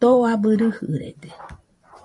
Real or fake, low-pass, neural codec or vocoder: fake; 10.8 kHz; vocoder, 44.1 kHz, 128 mel bands every 512 samples, BigVGAN v2